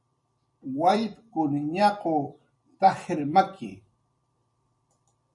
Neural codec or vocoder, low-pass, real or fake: vocoder, 44.1 kHz, 128 mel bands every 256 samples, BigVGAN v2; 10.8 kHz; fake